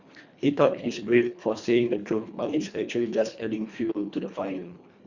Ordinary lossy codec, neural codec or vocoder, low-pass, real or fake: Opus, 64 kbps; codec, 24 kHz, 1.5 kbps, HILCodec; 7.2 kHz; fake